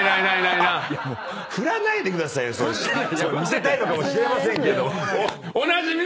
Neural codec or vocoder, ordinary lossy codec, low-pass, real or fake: none; none; none; real